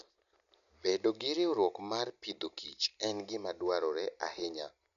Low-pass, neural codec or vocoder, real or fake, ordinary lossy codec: 7.2 kHz; none; real; none